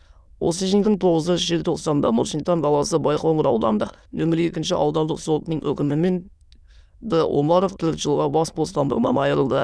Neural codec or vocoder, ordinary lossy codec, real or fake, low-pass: autoencoder, 22.05 kHz, a latent of 192 numbers a frame, VITS, trained on many speakers; none; fake; none